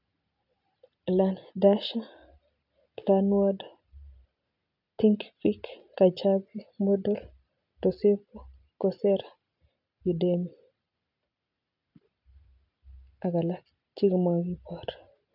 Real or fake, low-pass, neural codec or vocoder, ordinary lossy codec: real; 5.4 kHz; none; none